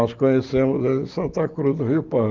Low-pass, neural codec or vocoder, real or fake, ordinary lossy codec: 7.2 kHz; none; real; Opus, 32 kbps